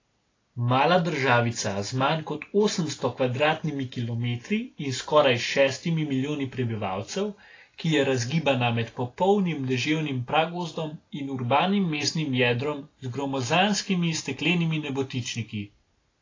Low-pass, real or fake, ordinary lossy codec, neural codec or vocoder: 7.2 kHz; real; AAC, 32 kbps; none